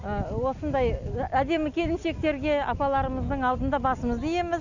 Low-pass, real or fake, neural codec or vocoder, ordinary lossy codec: 7.2 kHz; real; none; none